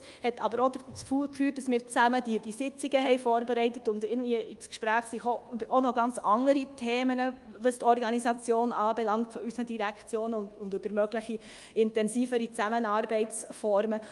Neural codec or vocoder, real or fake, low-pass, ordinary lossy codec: codec, 24 kHz, 1.2 kbps, DualCodec; fake; 10.8 kHz; Opus, 32 kbps